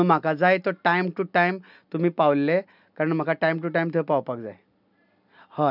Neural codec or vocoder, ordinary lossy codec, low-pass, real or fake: none; none; 5.4 kHz; real